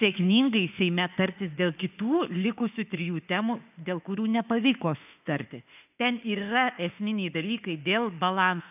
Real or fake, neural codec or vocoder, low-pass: fake; autoencoder, 48 kHz, 32 numbers a frame, DAC-VAE, trained on Japanese speech; 3.6 kHz